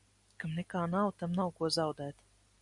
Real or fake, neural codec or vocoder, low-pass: real; none; 10.8 kHz